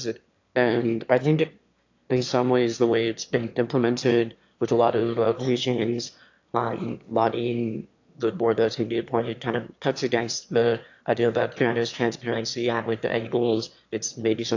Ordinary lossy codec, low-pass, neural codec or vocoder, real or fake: AAC, 48 kbps; 7.2 kHz; autoencoder, 22.05 kHz, a latent of 192 numbers a frame, VITS, trained on one speaker; fake